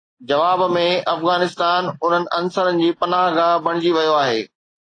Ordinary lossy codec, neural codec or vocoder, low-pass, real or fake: AAC, 32 kbps; none; 9.9 kHz; real